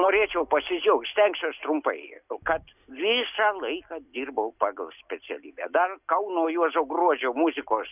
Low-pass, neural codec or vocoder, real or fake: 3.6 kHz; none; real